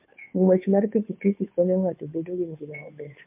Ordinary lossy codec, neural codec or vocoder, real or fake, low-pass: MP3, 32 kbps; codec, 16 kHz, 2 kbps, FunCodec, trained on Chinese and English, 25 frames a second; fake; 3.6 kHz